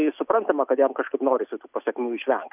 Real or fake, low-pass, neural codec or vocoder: real; 3.6 kHz; none